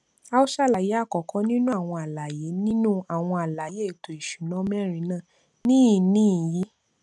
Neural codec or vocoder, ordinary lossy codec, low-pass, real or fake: none; none; none; real